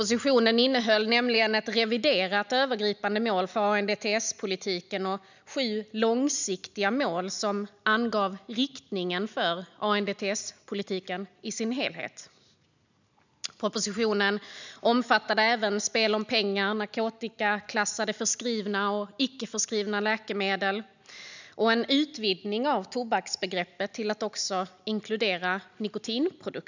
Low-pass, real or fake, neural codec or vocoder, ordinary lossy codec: 7.2 kHz; real; none; none